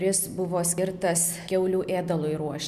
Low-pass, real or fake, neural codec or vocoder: 14.4 kHz; real; none